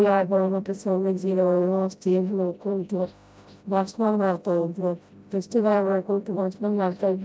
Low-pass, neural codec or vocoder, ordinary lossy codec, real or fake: none; codec, 16 kHz, 0.5 kbps, FreqCodec, smaller model; none; fake